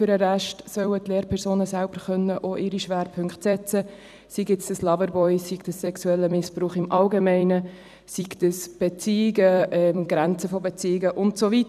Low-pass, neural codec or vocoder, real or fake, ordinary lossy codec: 14.4 kHz; vocoder, 44.1 kHz, 128 mel bands every 256 samples, BigVGAN v2; fake; none